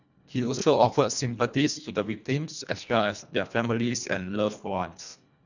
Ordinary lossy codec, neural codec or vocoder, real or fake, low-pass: none; codec, 24 kHz, 1.5 kbps, HILCodec; fake; 7.2 kHz